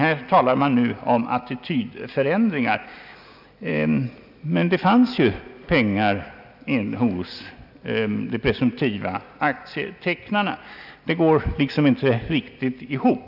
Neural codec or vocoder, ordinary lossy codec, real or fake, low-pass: none; none; real; 5.4 kHz